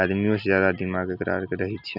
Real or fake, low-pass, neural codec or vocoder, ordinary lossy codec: real; 5.4 kHz; none; none